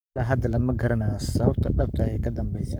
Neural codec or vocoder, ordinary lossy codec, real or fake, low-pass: codec, 44.1 kHz, 7.8 kbps, Pupu-Codec; none; fake; none